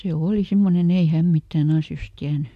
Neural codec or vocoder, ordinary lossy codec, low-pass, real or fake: none; MP3, 64 kbps; 14.4 kHz; real